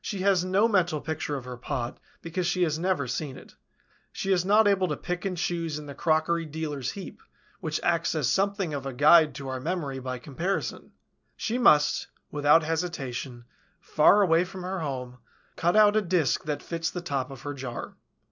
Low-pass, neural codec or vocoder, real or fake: 7.2 kHz; none; real